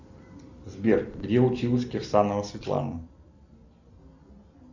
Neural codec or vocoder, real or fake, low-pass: none; real; 7.2 kHz